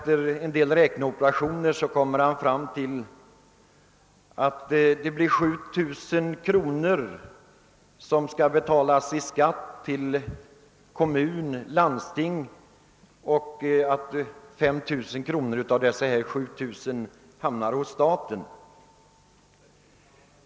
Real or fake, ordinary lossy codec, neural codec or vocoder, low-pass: real; none; none; none